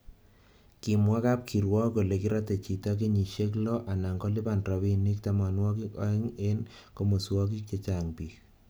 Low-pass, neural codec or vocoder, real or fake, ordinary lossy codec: none; none; real; none